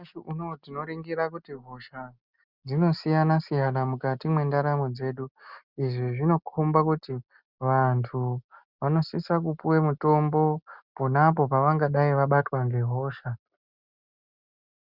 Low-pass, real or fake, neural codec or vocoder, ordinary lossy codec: 5.4 kHz; real; none; Opus, 64 kbps